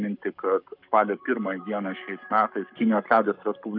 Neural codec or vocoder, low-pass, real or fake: codec, 44.1 kHz, 7.8 kbps, Pupu-Codec; 5.4 kHz; fake